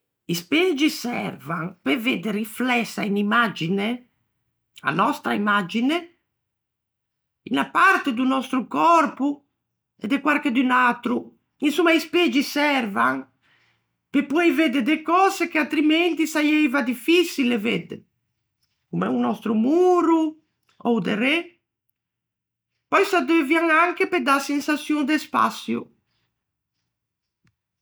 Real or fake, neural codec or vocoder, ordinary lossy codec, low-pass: real; none; none; none